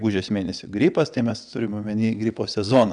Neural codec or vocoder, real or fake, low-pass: vocoder, 22.05 kHz, 80 mel bands, WaveNeXt; fake; 9.9 kHz